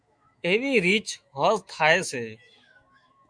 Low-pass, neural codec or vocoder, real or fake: 9.9 kHz; autoencoder, 48 kHz, 128 numbers a frame, DAC-VAE, trained on Japanese speech; fake